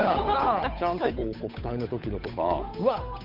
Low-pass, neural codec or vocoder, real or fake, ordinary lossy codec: 5.4 kHz; codec, 16 kHz, 8 kbps, FunCodec, trained on Chinese and English, 25 frames a second; fake; none